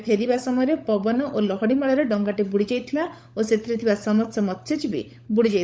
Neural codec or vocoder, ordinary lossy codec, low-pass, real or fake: codec, 16 kHz, 16 kbps, FunCodec, trained on LibriTTS, 50 frames a second; none; none; fake